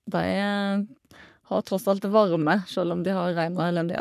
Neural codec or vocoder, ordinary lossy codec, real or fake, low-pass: codec, 44.1 kHz, 3.4 kbps, Pupu-Codec; none; fake; 14.4 kHz